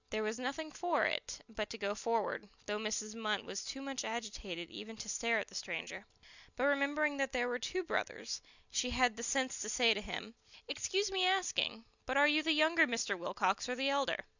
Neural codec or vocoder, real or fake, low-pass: none; real; 7.2 kHz